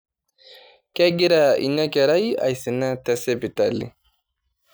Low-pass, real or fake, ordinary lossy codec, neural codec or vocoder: none; real; none; none